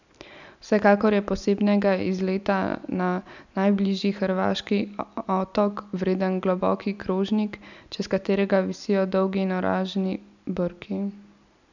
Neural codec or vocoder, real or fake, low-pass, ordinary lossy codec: none; real; 7.2 kHz; none